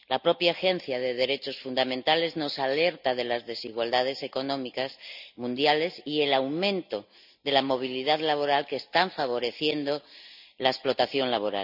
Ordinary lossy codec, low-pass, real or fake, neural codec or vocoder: none; 5.4 kHz; real; none